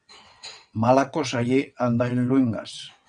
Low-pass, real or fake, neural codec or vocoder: 9.9 kHz; fake; vocoder, 22.05 kHz, 80 mel bands, WaveNeXt